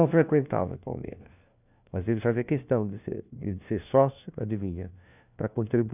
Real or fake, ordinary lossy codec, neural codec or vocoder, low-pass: fake; none; codec, 16 kHz, 1 kbps, FunCodec, trained on LibriTTS, 50 frames a second; 3.6 kHz